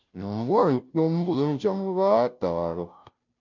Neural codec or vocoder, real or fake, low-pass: codec, 16 kHz, 0.5 kbps, FunCodec, trained on Chinese and English, 25 frames a second; fake; 7.2 kHz